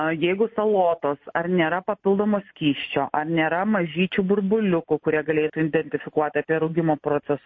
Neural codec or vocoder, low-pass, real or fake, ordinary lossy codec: none; 7.2 kHz; real; MP3, 32 kbps